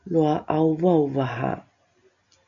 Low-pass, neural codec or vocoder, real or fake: 7.2 kHz; none; real